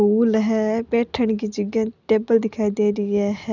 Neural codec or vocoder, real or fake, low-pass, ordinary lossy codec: none; real; 7.2 kHz; none